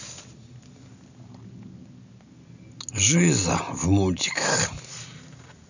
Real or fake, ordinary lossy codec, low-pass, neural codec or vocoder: real; none; 7.2 kHz; none